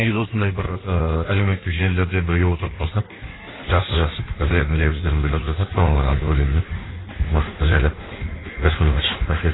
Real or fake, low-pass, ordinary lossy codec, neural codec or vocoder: fake; 7.2 kHz; AAC, 16 kbps; codec, 16 kHz in and 24 kHz out, 1.1 kbps, FireRedTTS-2 codec